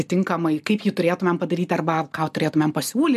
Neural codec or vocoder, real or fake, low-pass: none; real; 14.4 kHz